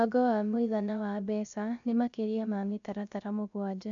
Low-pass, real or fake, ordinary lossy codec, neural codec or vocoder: 7.2 kHz; fake; none; codec, 16 kHz, 0.3 kbps, FocalCodec